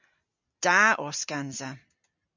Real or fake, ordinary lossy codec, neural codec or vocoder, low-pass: real; MP3, 64 kbps; none; 7.2 kHz